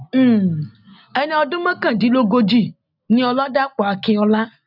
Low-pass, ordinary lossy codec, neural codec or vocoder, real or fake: 5.4 kHz; none; none; real